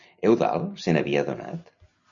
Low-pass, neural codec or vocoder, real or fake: 7.2 kHz; none; real